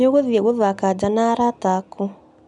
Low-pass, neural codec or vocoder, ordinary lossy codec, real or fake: 10.8 kHz; none; none; real